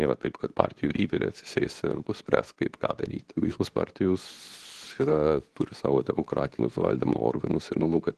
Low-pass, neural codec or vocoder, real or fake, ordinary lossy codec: 10.8 kHz; codec, 24 kHz, 0.9 kbps, WavTokenizer, medium speech release version 2; fake; Opus, 16 kbps